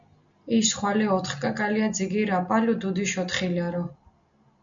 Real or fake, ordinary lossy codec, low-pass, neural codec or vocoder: real; MP3, 64 kbps; 7.2 kHz; none